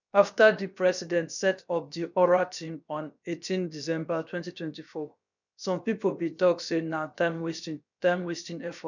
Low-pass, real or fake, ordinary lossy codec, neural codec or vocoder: 7.2 kHz; fake; none; codec, 16 kHz, about 1 kbps, DyCAST, with the encoder's durations